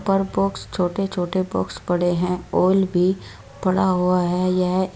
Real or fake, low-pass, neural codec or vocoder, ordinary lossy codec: real; none; none; none